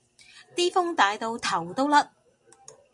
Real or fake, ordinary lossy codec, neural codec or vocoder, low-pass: real; MP3, 48 kbps; none; 10.8 kHz